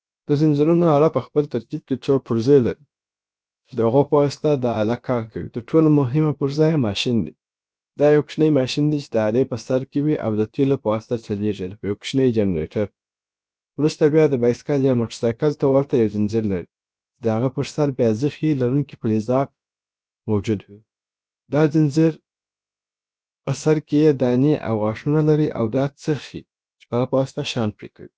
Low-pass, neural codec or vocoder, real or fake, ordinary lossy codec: none; codec, 16 kHz, about 1 kbps, DyCAST, with the encoder's durations; fake; none